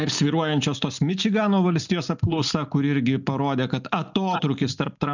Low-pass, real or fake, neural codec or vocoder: 7.2 kHz; real; none